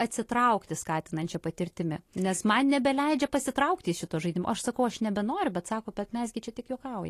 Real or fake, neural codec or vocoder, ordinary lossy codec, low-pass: real; none; AAC, 48 kbps; 14.4 kHz